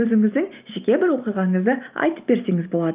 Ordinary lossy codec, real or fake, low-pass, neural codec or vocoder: Opus, 24 kbps; real; 3.6 kHz; none